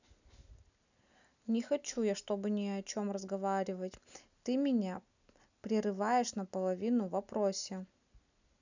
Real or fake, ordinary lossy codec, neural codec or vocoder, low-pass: real; none; none; 7.2 kHz